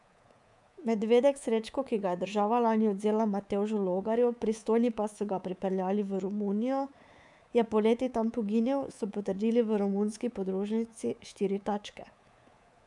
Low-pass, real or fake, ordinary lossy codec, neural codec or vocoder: 10.8 kHz; fake; none; codec, 24 kHz, 3.1 kbps, DualCodec